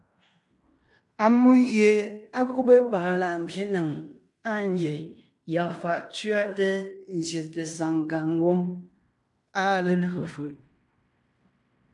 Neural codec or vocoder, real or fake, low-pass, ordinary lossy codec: codec, 16 kHz in and 24 kHz out, 0.9 kbps, LongCat-Audio-Codec, fine tuned four codebook decoder; fake; 10.8 kHz; MP3, 64 kbps